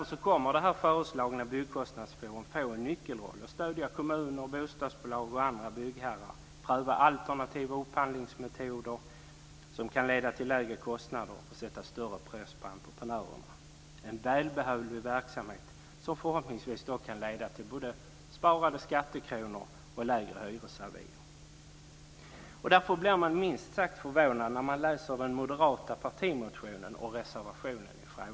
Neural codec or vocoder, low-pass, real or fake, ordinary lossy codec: none; none; real; none